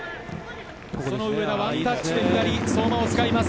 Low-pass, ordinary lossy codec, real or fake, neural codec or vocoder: none; none; real; none